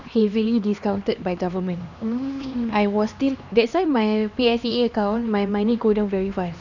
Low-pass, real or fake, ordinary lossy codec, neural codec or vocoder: 7.2 kHz; fake; none; codec, 16 kHz, 2 kbps, X-Codec, HuBERT features, trained on LibriSpeech